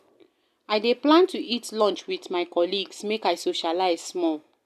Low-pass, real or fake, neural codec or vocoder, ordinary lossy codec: 14.4 kHz; real; none; none